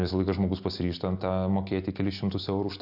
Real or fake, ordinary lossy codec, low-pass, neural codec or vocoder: real; AAC, 48 kbps; 5.4 kHz; none